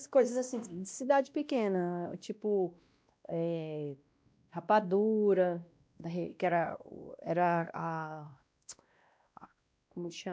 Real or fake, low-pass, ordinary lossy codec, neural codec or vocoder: fake; none; none; codec, 16 kHz, 1 kbps, X-Codec, WavLM features, trained on Multilingual LibriSpeech